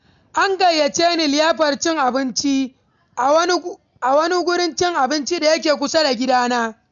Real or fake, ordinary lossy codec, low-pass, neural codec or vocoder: real; none; 7.2 kHz; none